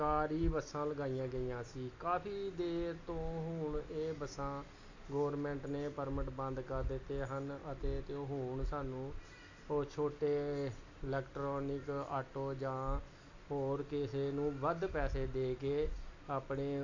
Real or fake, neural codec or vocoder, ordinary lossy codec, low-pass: real; none; AAC, 48 kbps; 7.2 kHz